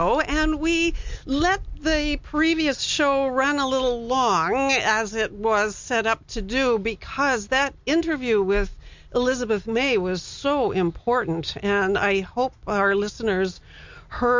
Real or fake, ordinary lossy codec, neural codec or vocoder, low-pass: real; MP3, 64 kbps; none; 7.2 kHz